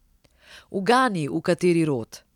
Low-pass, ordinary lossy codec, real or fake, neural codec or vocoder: 19.8 kHz; none; real; none